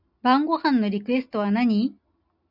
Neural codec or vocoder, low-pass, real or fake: none; 5.4 kHz; real